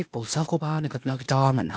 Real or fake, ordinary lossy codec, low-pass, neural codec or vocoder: fake; none; none; codec, 16 kHz, 0.8 kbps, ZipCodec